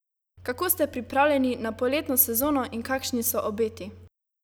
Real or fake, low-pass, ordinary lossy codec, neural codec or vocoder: real; none; none; none